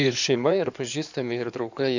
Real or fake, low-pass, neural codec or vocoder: fake; 7.2 kHz; codec, 16 kHz in and 24 kHz out, 2.2 kbps, FireRedTTS-2 codec